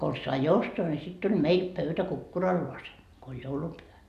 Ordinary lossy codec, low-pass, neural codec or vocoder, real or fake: none; 14.4 kHz; none; real